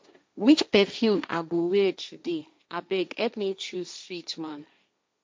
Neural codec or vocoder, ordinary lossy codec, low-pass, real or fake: codec, 16 kHz, 1.1 kbps, Voila-Tokenizer; none; none; fake